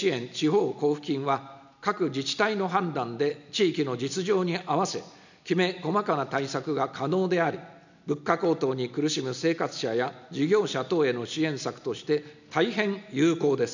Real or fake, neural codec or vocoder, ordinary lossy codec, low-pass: real; none; none; 7.2 kHz